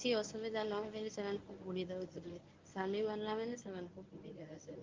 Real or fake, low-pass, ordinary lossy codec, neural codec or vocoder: fake; 7.2 kHz; Opus, 32 kbps; codec, 24 kHz, 0.9 kbps, WavTokenizer, medium speech release version 1